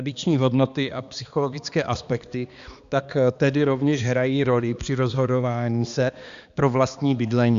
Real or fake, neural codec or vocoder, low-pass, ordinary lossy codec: fake; codec, 16 kHz, 2 kbps, X-Codec, HuBERT features, trained on balanced general audio; 7.2 kHz; Opus, 64 kbps